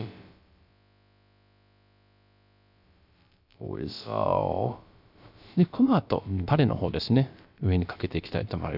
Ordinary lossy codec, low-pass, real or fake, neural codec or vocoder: none; 5.4 kHz; fake; codec, 16 kHz, about 1 kbps, DyCAST, with the encoder's durations